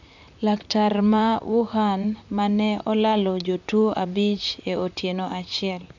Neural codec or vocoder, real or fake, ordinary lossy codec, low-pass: vocoder, 44.1 kHz, 128 mel bands, Pupu-Vocoder; fake; none; 7.2 kHz